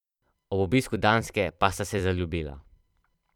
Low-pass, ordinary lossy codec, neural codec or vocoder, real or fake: 19.8 kHz; none; vocoder, 44.1 kHz, 128 mel bands every 256 samples, BigVGAN v2; fake